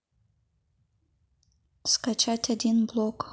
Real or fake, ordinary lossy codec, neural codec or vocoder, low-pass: real; none; none; none